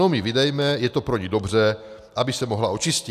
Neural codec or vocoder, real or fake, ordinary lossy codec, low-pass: none; real; AAC, 96 kbps; 14.4 kHz